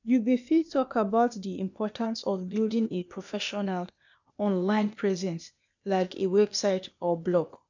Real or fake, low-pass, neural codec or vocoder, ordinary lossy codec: fake; 7.2 kHz; codec, 16 kHz, 0.8 kbps, ZipCodec; none